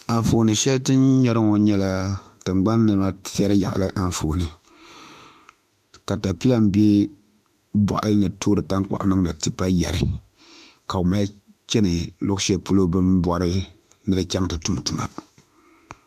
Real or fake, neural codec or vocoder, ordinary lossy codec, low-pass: fake; autoencoder, 48 kHz, 32 numbers a frame, DAC-VAE, trained on Japanese speech; MP3, 96 kbps; 14.4 kHz